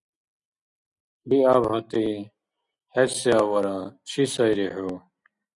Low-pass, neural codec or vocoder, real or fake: 10.8 kHz; none; real